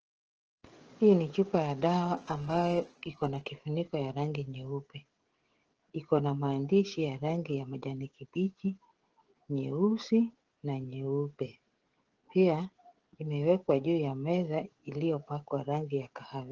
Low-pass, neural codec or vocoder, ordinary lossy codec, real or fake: 7.2 kHz; codec, 16 kHz, 16 kbps, FreqCodec, smaller model; Opus, 16 kbps; fake